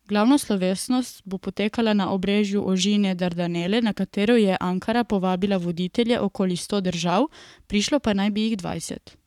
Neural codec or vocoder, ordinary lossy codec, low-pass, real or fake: codec, 44.1 kHz, 7.8 kbps, Pupu-Codec; none; 19.8 kHz; fake